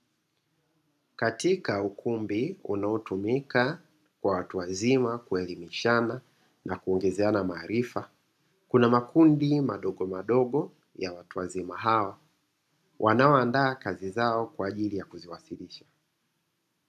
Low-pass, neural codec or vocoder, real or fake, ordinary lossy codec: 14.4 kHz; none; real; AAC, 96 kbps